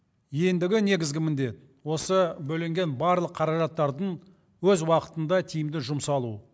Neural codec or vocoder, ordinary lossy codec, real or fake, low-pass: none; none; real; none